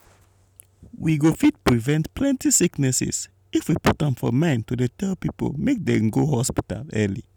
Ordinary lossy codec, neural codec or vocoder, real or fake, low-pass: none; none; real; none